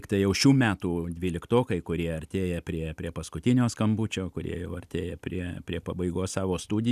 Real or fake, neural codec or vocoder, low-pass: real; none; 14.4 kHz